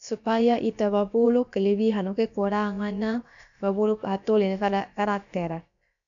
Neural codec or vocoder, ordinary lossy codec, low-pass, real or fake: codec, 16 kHz, about 1 kbps, DyCAST, with the encoder's durations; none; 7.2 kHz; fake